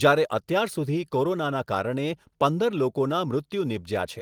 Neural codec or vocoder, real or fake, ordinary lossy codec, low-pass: none; real; Opus, 24 kbps; 19.8 kHz